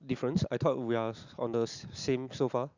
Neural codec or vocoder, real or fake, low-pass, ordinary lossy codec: none; real; 7.2 kHz; Opus, 64 kbps